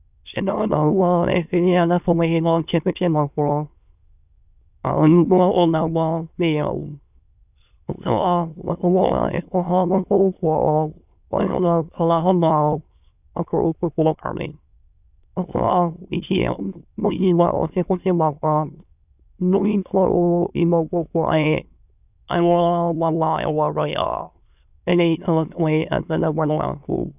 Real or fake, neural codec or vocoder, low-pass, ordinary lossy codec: fake; autoencoder, 22.05 kHz, a latent of 192 numbers a frame, VITS, trained on many speakers; 3.6 kHz; none